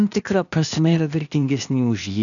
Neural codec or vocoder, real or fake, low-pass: codec, 16 kHz, 0.8 kbps, ZipCodec; fake; 7.2 kHz